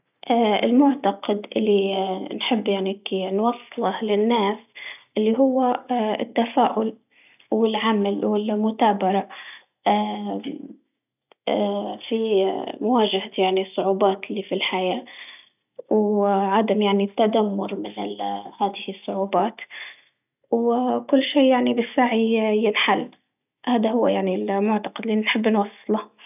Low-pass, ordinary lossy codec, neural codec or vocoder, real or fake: 3.6 kHz; none; none; real